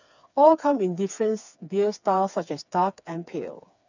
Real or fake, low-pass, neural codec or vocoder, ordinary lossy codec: fake; 7.2 kHz; codec, 16 kHz, 4 kbps, FreqCodec, smaller model; none